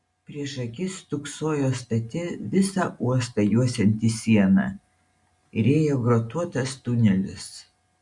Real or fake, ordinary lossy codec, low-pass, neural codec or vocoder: real; MP3, 64 kbps; 10.8 kHz; none